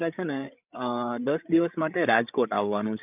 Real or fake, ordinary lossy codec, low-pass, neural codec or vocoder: fake; none; 3.6 kHz; codec, 16 kHz, 8 kbps, FreqCodec, larger model